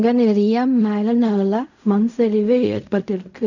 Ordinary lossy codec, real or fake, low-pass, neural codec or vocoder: none; fake; 7.2 kHz; codec, 16 kHz in and 24 kHz out, 0.4 kbps, LongCat-Audio-Codec, fine tuned four codebook decoder